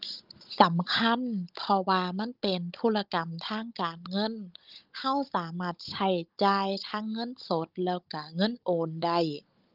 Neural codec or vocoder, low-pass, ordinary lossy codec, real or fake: codec, 16 kHz, 16 kbps, FunCodec, trained on Chinese and English, 50 frames a second; 5.4 kHz; Opus, 32 kbps; fake